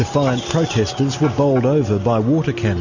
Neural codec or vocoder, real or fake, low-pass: none; real; 7.2 kHz